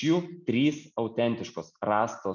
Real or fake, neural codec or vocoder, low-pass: real; none; 7.2 kHz